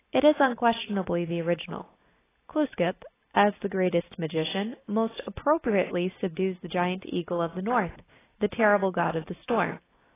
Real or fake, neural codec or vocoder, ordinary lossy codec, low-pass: real; none; AAC, 16 kbps; 3.6 kHz